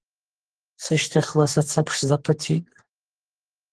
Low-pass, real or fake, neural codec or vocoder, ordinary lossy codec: 10.8 kHz; fake; codec, 44.1 kHz, 2.6 kbps, SNAC; Opus, 16 kbps